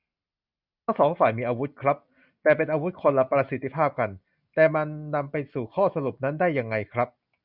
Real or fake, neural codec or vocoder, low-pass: real; none; 5.4 kHz